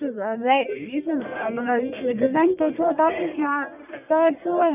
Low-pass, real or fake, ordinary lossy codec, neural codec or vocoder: 3.6 kHz; fake; none; codec, 44.1 kHz, 1.7 kbps, Pupu-Codec